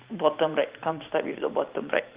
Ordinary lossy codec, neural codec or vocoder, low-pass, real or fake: Opus, 32 kbps; vocoder, 44.1 kHz, 128 mel bands every 512 samples, BigVGAN v2; 3.6 kHz; fake